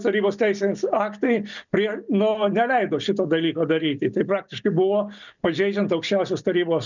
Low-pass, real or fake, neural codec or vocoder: 7.2 kHz; real; none